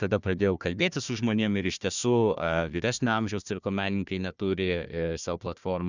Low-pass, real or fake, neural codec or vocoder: 7.2 kHz; fake; codec, 16 kHz, 1 kbps, FunCodec, trained on Chinese and English, 50 frames a second